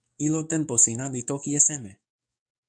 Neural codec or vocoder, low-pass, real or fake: codec, 44.1 kHz, 7.8 kbps, DAC; 9.9 kHz; fake